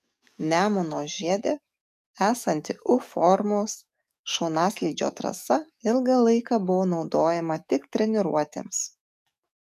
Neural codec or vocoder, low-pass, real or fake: codec, 44.1 kHz, 7.8 kbps, DAC; 14.4 kHz; fake